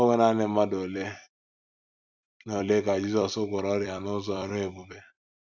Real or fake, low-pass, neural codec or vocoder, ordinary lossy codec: fake; 7.2 kHz; vocoder, 44.1 kHz, 128 mel bands every 256 samples, BigVGAN v2; none